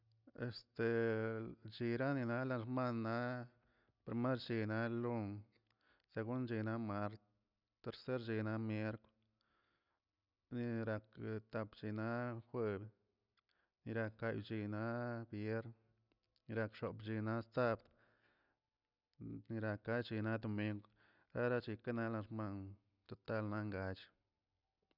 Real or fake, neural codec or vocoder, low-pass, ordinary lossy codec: real; none; 5.4 kHz; none